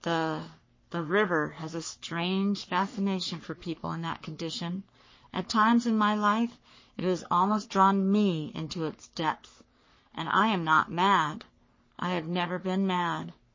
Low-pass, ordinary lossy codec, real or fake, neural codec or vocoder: 7.2 kHz; MP3, 32 kbps; fake; codec, 44.1 kHz, 3.4 kbps, Pupu-Codec